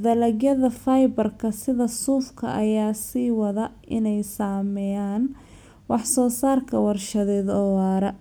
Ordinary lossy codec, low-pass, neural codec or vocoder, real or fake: none; none; none; real